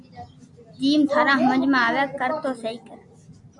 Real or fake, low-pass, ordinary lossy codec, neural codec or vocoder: real; 10.8 kHz; MP3, 64 kbps; none